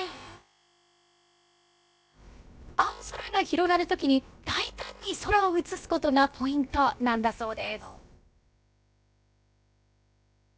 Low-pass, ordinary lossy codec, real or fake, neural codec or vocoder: none; none; fake; codec, 16 kHz, about 1 kbps, DyCAST, with the encoder's durations